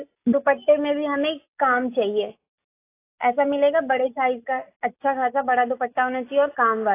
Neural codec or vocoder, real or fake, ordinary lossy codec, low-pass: none; real; AAC, 24 kbps; 3.6 kHz